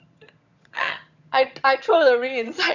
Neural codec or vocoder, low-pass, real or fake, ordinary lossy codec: vocoder, 22.05 kHz, 80 mel bands, HiFi-GAN; 7.2 kHz; fake; none